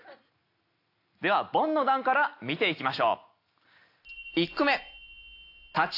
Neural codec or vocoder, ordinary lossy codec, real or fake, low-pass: none; AAC, 32 kbps; real; 5.4 kHz